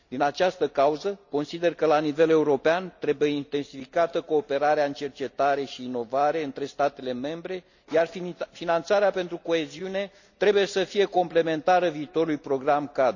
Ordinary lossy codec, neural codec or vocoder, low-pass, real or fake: none; none; 7.2 kHz; real